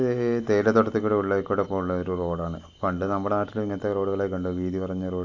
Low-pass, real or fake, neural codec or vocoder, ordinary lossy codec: 7.2 kHz; real; none; AAC, 48 kbps